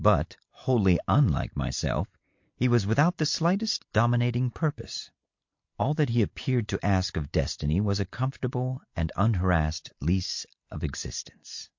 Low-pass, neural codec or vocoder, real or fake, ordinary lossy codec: 7.2 kHz; none; real; MP3, 48 kbps